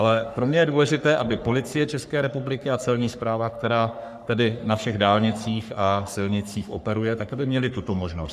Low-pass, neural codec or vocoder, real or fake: 14.4 kHz; codec, 44.1 kHz, 3.4 kbps, Pupu-Codec; fake